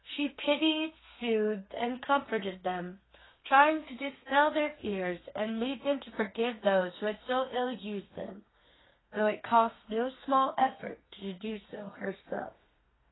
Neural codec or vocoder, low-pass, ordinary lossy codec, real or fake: codec, 32 kHz, 1.9 kbps, SNAC; 7.2 kHz; AAC, 16 kbps; fake